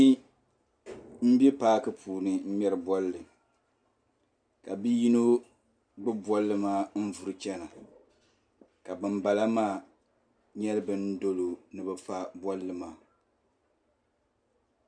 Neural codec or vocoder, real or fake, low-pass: none; real; 9.9 kHz